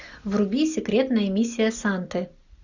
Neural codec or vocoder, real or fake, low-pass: none; real; 7.2 kHz